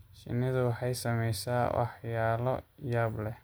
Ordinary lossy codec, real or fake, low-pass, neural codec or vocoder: none; real; none; none